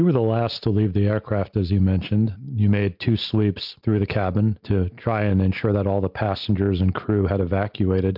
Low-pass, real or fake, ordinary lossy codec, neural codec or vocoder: 5.4 kHz; real; MP3, 48 kbps; none